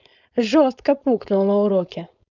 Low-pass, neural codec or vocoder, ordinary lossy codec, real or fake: 7.2 kHz; codec, 16 kHz, 4.8 kbps, FACodec; none; fake